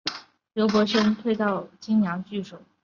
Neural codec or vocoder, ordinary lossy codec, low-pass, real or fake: none; Opus, 64 kbps; 7.2 kHz; real